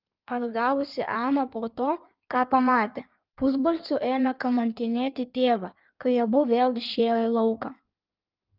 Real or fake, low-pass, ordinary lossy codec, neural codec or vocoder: fake; 5.4 kHz; Opus, 24 kbps; codec, 16 kHz in and 24 kHz out, 1.1 kbps, FireRedTTS-2 codec